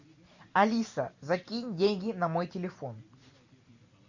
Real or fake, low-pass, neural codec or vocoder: real; 7.2 kHz; none